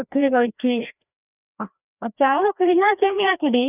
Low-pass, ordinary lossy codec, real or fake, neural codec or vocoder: 3.6 kHz; none; fake; codec, 16 kHz, 1 kbps, FreqCodec, larger model